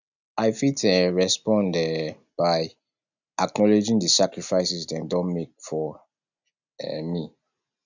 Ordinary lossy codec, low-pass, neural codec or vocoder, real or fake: none; 7.2 kHz; none; real